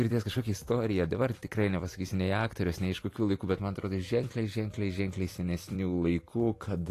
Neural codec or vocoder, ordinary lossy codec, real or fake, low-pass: vocoder, 44.1 kHz, 128 mel bands every 256 samples, BigVGAN v2; AAC, 48 kbps; fake; 14.4 kHz